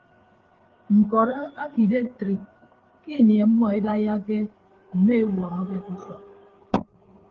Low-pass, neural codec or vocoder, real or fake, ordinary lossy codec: 7.2 kHz; codec, 16 kHz, 4 kbps, FreqCodec, larger model; fake; Opus, 16 kbps